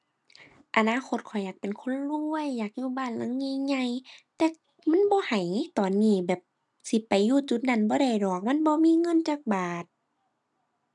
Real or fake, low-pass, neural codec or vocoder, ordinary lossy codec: real; 10.8 kHz; none; none